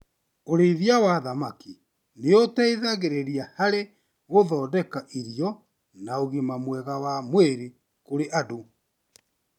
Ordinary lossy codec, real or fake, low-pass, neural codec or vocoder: none; real; 19.8 kHz; none